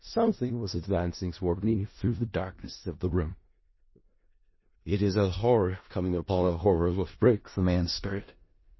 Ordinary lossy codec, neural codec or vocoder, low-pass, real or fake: MP3, 24 kbps; codec, 16 kHz in and 24 kHz out, 0.4 kbps, LongCat-Audio-Codec, four codebook decoder; 7.2 kHz; fake